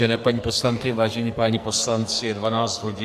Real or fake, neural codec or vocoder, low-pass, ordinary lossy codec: fake; codec, 44.1 kHz, 2.6 kbps, SNAC; 14.4 kHz; AAC, 96 kbps